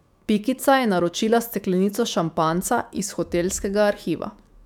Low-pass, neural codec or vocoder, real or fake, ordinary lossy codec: 19.8 kHz; autoencoder, 48 kHz, 128 numbers a frame, DAC-VAE, trained on Japanese speech; fake; none